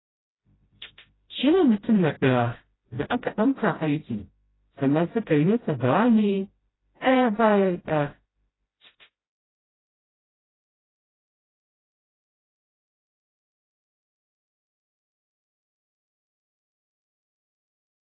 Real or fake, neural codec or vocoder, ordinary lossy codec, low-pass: fake; codec, 16 kHz, 0.5 kbps, FreqCodec, smaller model; AAC, 16 kbps; 7.2 kHz